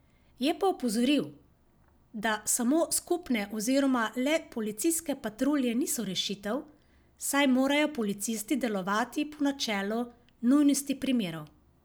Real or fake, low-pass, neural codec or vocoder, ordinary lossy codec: real; none; none; none